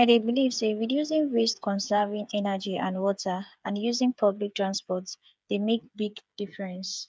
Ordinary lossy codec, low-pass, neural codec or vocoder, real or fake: none; none; codec, 16 kHz, 8 kbps, FreqCodec, smaller model; fake